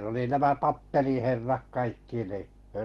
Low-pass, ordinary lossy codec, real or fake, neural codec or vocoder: 19.8 kHz; Opus, 16 kbps; real; none